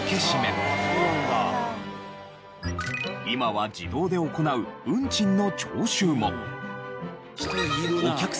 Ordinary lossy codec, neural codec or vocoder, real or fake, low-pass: none; none; real; none